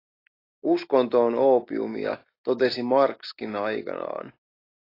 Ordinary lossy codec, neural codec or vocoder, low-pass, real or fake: AAC, 32 kbps; none; 5.4 kHz; real